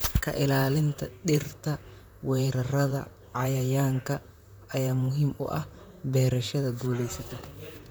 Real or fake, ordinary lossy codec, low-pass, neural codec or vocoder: fake; none; none; vocoder, 44.1 kHz, 128 mel bands, Pupu-Vocoder